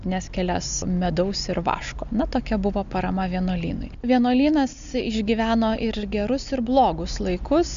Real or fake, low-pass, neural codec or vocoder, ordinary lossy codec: real; 7.2 kHz; none; MP3, 64 kbps